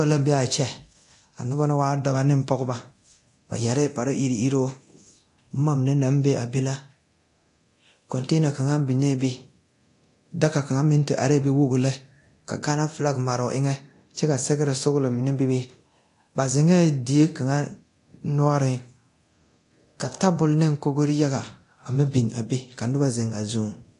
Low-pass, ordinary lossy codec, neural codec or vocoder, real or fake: 10.8 kHz; AAC, 48 kbps; codec, 24 kHz, 0.9 kbps, DualCodec; fake